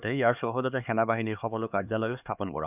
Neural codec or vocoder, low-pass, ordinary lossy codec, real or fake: codec, 16 kHz, 2 kbps, X-Codec, HuBERT features, trained on LibriSpeech; 3.6 kHz; none; fake